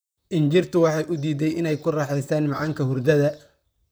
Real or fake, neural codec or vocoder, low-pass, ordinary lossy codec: fake; vocoder, 44.1 kHz, 128 mel bands, Pupu-Vocoder; none; none